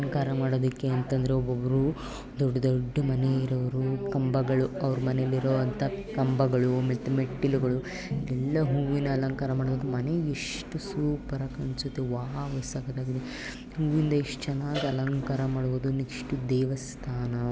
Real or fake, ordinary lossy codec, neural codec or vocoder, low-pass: real; none; none; none